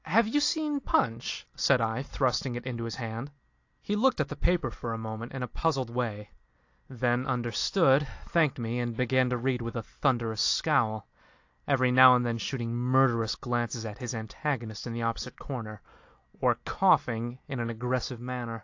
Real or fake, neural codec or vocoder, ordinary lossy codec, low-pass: real; none; AAC, 48 kbps; 7.2 kHz